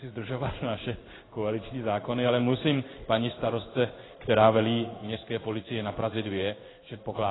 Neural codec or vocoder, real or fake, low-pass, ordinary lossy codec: codec, 16 kHz in and 24 kHz out, 1 kbps, XY-Tokenizer; fake; 7.2 kHz; AAC, 16 kbps